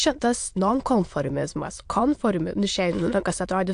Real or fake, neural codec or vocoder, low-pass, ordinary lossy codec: fake; autoencoder, 22.05 kHz, a latent of 192 numbers a frame, VITS, trained on many speakers; 9.9 kHz; AAC, 64 kbps